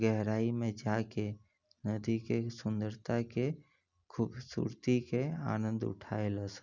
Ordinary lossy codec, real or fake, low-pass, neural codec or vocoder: none; real; 7.2 kHz; none